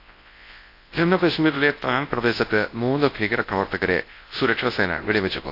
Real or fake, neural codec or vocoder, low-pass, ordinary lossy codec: fake; codec, 24 kHz, 0.9 kbps, WavTokenizer, large speech release; 5.4 kHz; AAC, 32 kbps